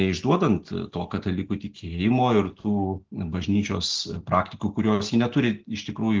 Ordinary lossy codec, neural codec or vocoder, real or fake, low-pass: Opus, 16 kbps; none; real; 7.2 kHz